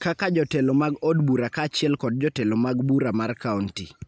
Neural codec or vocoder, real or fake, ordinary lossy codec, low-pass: none; real; none; none